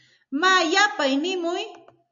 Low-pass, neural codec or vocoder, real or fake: 7.2 kHz; none; real